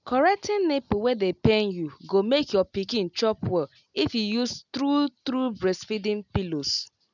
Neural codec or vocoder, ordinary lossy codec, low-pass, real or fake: none; none; 7.2 kHz; real